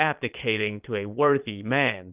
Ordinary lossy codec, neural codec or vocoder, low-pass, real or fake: Opus, 24 kbps; vocoder, 22.05 kHz, 80 mel bands, Vocos; 3.6 kHz; fake